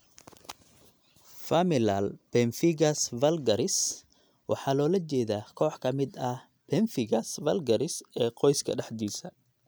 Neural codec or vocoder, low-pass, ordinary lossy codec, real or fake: none; none; none; real